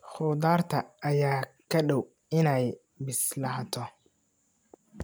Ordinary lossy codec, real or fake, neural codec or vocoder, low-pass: none; real; none; none